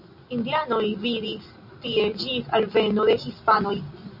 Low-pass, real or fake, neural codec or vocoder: 5.4 kHz; real; none